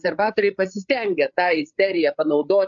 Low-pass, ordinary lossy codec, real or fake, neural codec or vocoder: 7.2 kHz; AAC, 64 kbps; fake; codec, 16 kHz, 8 kbps, FreqCodec, larger model